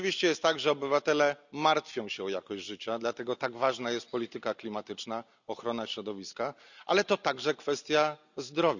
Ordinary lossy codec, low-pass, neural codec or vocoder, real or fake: none; 7.2 kHz; none; real